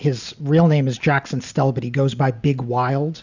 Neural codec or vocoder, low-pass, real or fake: none; 7.2 kHz; real